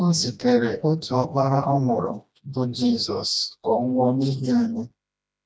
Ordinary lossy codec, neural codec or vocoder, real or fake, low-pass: none; codec, 16 kHz, 1 kbps, FreqCodec, smaller model; fake; none